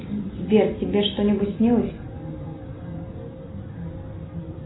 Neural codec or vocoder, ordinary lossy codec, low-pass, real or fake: none; AAC, 16 kbps; 7.2 kHz; real